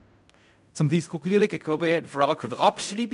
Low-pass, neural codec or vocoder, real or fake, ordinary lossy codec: 10.8 kHz; codec, 16 kHz in and 24 kHz out, 0.4 kbps, LongCat-Audio-Codec, fine tuned four codebook decoder; fake; none